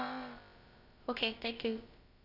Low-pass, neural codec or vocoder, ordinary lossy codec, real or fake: 5.4 kHz; codec, 16 kHz, about 1 kbps, DyCAST, with the encoder's durations; none; fake